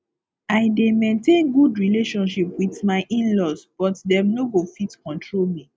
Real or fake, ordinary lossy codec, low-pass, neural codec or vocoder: real; none; none; none